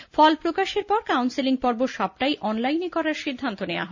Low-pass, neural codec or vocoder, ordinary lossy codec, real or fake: 7.2 kHz; none; none; real